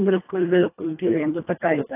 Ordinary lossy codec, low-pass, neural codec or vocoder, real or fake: AAC, 24 kbps; 3.6 kHz; codec, 24 kHz, 1.5 kbps, HILCodec; fake